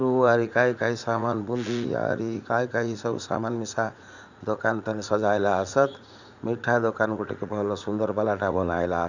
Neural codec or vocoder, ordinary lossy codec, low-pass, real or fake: vocoder, 44.1 kHz, 80 mel bands, Vocos; none; 7.2 kHz; fake